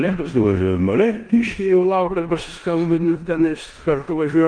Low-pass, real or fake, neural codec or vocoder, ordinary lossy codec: 9.9 kHz; fake; codec, 16 kHz in and 24 kHz out, 0.9 kbps, LongCat-Audio-Codec, four codebook decoder; Opus, 24 kbps